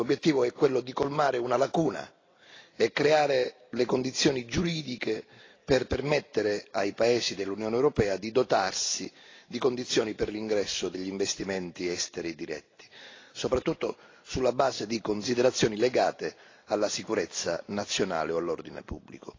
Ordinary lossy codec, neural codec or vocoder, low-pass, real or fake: AAC, 32 kbps; none; 7.2 kHz; real